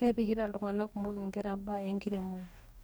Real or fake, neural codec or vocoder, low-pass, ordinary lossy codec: fake; codec, 44.1 kHz, 2.6 kbps, DAC; none; none